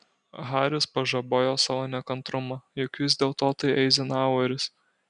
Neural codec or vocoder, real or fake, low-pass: none; real; 9.9 kHz